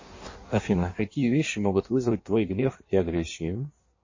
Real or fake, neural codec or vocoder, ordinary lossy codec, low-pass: fake; codec, 16 kHz in and 24 kHz out, 1.1 kbps, FireRedTTS-2 codec; MP3, 32 kbps; 7.2 kHz